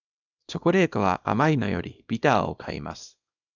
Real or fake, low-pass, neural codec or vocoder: fake; 7.2 kHz; codec, 24 kHz, 0.9 kbps, WavTokenizer, small release